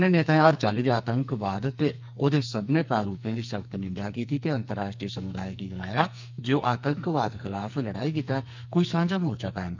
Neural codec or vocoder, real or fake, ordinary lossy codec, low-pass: codec, 44.1 kHz, 2.6 kbps, SNAC; fake; MP3, 64 kbps; 7.2 kHz